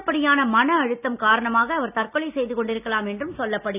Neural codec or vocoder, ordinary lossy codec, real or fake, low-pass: none; none; real; 3.6 kHz